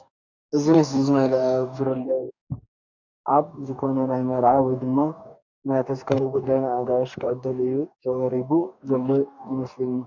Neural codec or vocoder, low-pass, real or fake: codec, 44.1 kHz, 2.6 kbps, DAC; 7.2 kHz; fake